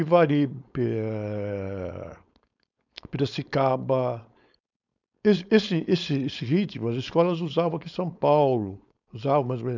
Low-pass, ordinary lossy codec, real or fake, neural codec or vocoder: 7.2 kHz; none; fake; codec, 16 kHz, 4.8 kbps, FACodec